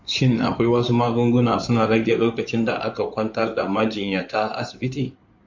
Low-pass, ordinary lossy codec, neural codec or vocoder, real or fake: 7.2 kHz; MP3, 64 kbps; codec, 16 kHz in and 24 kHz out, 2.2 kbps, FireRedTTS-2 codec; fake